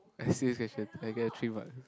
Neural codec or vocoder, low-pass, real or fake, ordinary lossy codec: none; none; real; none